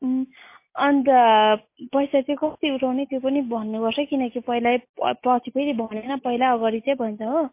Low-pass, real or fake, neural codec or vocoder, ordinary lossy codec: 3.6 kHz; real; none; MP3, 24 kbps